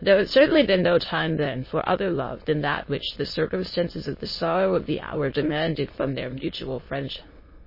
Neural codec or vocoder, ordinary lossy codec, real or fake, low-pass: autoencoder, 22.05 kHz, a latent of 192 numbers a frame, VITS, trained on many speakers; MP3, 24 kbps; fake; 5.4 kHz